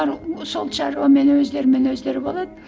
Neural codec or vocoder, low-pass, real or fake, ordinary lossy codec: none; none; real; none